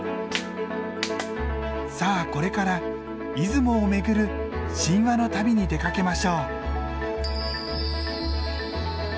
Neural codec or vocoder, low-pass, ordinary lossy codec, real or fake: none; none; none; real